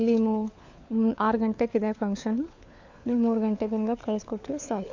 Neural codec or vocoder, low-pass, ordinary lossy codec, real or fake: codec, 16 kHz, 4 kbps, FunCodec, trained on LibriTTS, 50 frames a second; 7.2 kHz; AAC, 48 kbps; fake